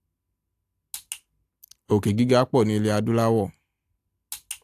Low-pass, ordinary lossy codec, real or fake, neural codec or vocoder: 14.4 kHz; AAC, 64 kbps; real; none